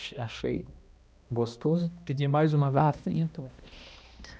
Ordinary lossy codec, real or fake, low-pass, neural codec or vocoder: none; fake; none; codec, 16 kHz, 1 kbps, X-Codec, HuBERT features, trained on balanced general audio